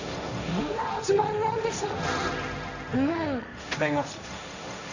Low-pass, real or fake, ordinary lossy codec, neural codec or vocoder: 7.2 kHz; fake; none; codec, 16 kHz, 1.1 kbps, Voila-Tokenizer